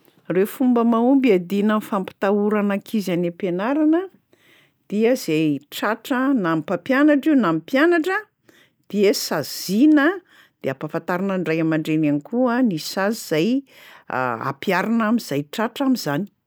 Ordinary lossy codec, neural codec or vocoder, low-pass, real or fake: none; none; none; real